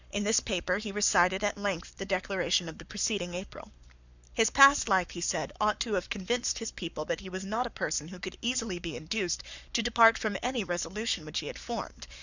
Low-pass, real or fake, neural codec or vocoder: 7.2 kHz; fake; codec, 44.1 kHz, 7.8 kbps, Pupu-Codec